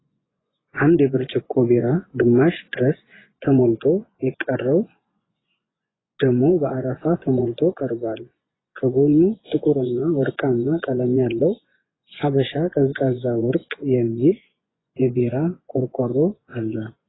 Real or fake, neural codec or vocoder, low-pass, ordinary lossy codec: fake; vocoder, 24 kHz, 100 mel bands, Vocos; 7.2 kHz; AAC, 16 kbps